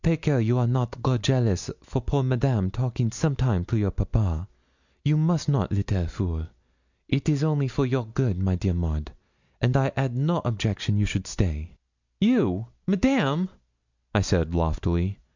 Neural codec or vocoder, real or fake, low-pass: none; real; 7.2 kHz